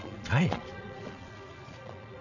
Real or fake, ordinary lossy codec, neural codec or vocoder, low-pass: fake; none; vocoder, 22.05 kHz, 80 mel bands, Vocos; 7.2 kHz